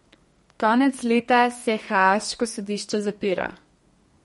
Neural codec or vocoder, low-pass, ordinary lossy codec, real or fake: codec, 32 kHz, 1.9 kbps, SNAC; 14.4 kHz; MP3, 48 kbps; fake